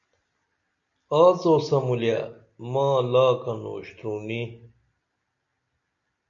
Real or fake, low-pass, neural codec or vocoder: real; 7.2 kHz; none